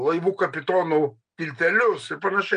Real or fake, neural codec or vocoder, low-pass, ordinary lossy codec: real; none; 9.9 kHz; MP3, 64 kbps